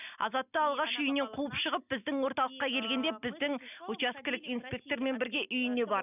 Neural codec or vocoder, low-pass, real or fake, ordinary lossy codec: none; 3.6 kHz; real; none